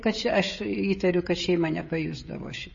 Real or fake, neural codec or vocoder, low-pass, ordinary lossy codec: fake; codec, 16 kHz, 16 kbps, FreqCodec, smaller model; 7.2 kHz; MP3, 32 kbps